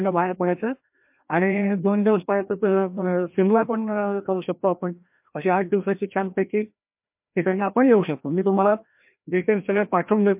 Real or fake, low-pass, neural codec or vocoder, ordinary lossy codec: fake; 3.6 kHz; codec, 16 kHz, 1 kbps, FreqCodec, larger model; MP3, 32 kbps